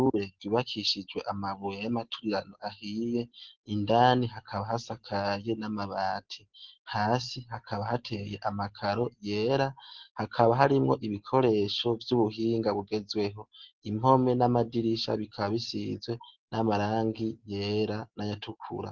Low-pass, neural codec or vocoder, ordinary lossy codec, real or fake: 7.2 kHz; none; Opus, 16 kbps; real